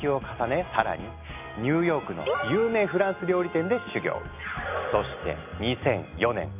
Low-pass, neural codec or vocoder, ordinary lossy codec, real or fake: 3.6 kHz; none; none; real